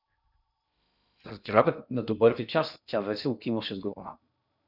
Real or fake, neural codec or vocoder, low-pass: fake; codec, 16 kHz in and 24 kHz out, 0.6 kbps, FocalCodec, streaming, 2048 codes; 5.4 kHz